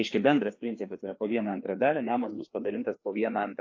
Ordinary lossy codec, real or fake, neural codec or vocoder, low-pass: AAC, 48 kbps; fake; codec, 16 kHz, 2 kbps, FreqCodec, larger model; 7.2 kHz